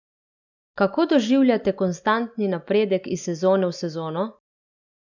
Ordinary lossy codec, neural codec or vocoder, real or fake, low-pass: none; none; real; 7.2 kHz